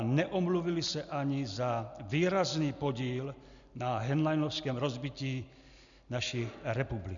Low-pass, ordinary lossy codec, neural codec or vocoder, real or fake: 7.2 kHz; MP3, 96 kbps; none; real